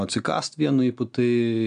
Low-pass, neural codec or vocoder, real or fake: 9.9 kHz; none; real